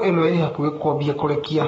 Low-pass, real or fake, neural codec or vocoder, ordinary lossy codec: 19.8 kHz; fake; codec, 44.1 kHz, 7.8 kbps, Pupu-Codec; AAC, 24 kbps